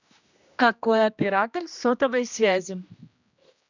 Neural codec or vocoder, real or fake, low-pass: codec, 16 kHz, 1 kbps, X-Codec, HuBERT features, trained on general audio; fake; 7.2 kHz